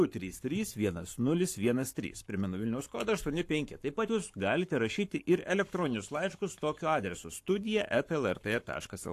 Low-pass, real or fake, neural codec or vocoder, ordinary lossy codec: 14.4 kHz; fake; codec, 44.1 kHz, 7.8 kbps, Pupu-Codec; AAC, 64 kbps